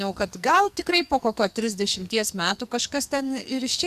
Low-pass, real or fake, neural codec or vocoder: 14.4 kHz; fake; codec, 44.1 kHz, 2.6 kbps, SNAC